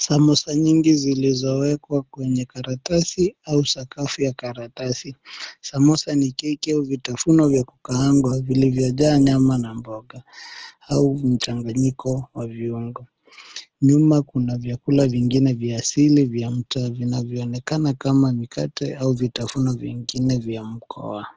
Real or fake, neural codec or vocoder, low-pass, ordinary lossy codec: real; none; 7.2 kHz; Opus, 16 kbps